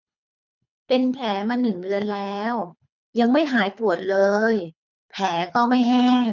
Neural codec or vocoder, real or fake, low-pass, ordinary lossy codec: codec, 24 kHz, 3 kbps, HILCodec; fake; 7.2 kHz; none